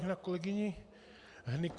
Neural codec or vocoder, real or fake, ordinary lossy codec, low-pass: none; real; Opus, 64 kbps; 10.8 kHz